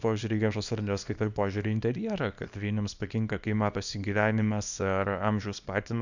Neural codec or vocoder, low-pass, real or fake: codec, 24 kHz, 0.9 kbps, WavTokenizer, small release; 7.2 kHz; fake